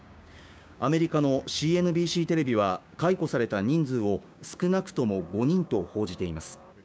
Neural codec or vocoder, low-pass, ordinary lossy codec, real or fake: codec, 16 kHz, 6 kbps, DAC; none; none; fake